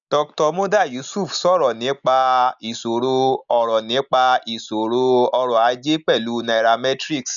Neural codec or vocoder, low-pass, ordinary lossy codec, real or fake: none; 7.2 kHz; none; real